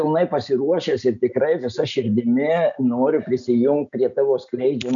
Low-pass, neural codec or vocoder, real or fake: 7.2 kHz; none; real